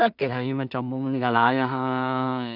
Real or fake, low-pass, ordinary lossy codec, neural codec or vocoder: fake; 5.4 kHz; none; codec, 16 kHz in and 24 kHz out, 0.4 kbps, LongCat-Audio-Codec, two codebook decoder